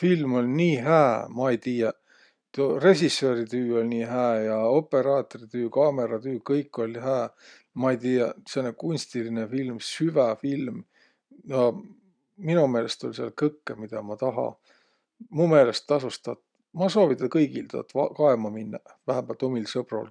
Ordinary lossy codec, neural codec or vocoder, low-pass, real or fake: none; none; 9.9 kHz; real